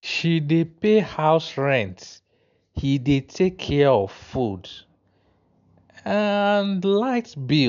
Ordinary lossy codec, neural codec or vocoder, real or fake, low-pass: none; none; real; 7.2 kHz